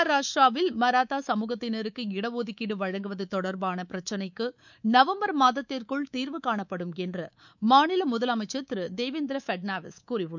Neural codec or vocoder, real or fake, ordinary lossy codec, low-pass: codec, 24 kHz, 3.1 kbps, DualCodec; fake; none; 7.2 kHz